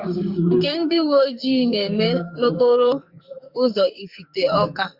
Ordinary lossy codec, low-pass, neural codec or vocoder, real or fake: Opus, 64 kbps; 5.4 kHz; codec, 44.1 kHz, 2.6 kbps, SNAC; fake